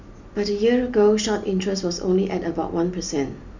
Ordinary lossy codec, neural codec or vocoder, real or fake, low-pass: none; none; real; 7.2 kHz